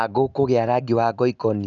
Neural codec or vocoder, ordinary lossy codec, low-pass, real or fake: none; none; 7.2 kHz; real